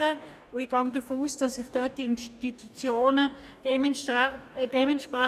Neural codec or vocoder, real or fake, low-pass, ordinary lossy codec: codec, 44.1 kHz, 2.6 kbps, DAC; fake; 14.4 kHz; none